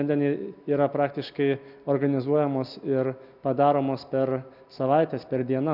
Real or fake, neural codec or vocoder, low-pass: real; none; 5.4 kHz